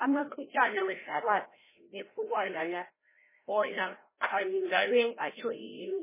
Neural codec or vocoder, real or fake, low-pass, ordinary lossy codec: codec, 16 kHz, 0.5 kbps, FreqCodec, larger model; fake; 3.6 kHz; MP3, 16 kbps